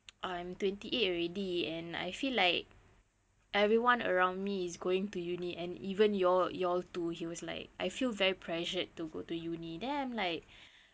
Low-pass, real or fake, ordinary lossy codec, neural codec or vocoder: none; real; none; none